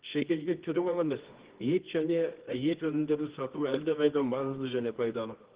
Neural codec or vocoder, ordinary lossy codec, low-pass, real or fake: codec, 24 kHz, 0.9 kbps, WavTokenizer, medium music audio release; Opus, 16 kbps; 3.6 kHz; fake